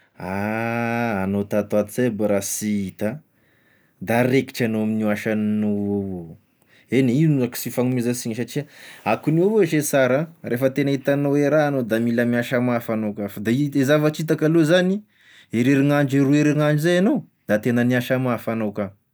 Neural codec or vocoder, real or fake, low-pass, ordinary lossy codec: none; real; none; none